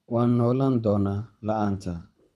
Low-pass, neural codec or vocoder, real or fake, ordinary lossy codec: none; codec, 24 kHz, 6 kbps, HILCodec; fake; none